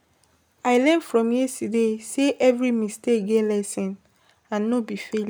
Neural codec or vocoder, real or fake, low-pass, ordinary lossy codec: none; real; none; none